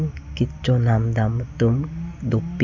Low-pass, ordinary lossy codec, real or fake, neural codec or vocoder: 7.2 kHz; none; real; none